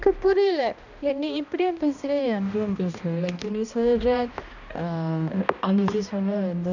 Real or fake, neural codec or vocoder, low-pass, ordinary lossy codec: fake; codec, 16 kHz, 1 kbps, X-Codec, HuBERT features, trained on balanced general audio; 7.2 kHz; none